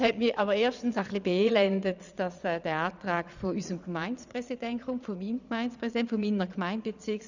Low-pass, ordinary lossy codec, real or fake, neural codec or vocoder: 7.2 kHz; none; fake; vocoder, 22.05 kHz, 80 mel bands, Vocos